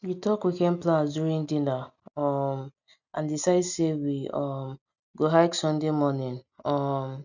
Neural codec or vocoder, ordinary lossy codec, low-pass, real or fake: none; none; 7.2 kHz; real